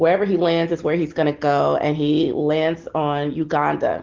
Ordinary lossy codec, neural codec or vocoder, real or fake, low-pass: Opus, 16 kbps; none; real; 7.2 kHz